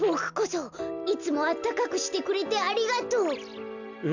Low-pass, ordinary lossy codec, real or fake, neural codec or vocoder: 7.2 kHz; none; real; none